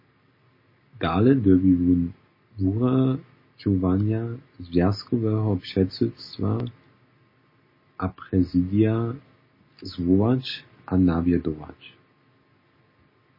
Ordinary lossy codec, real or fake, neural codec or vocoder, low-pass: MP3, 24 kbps; real; none; 5.4 kHz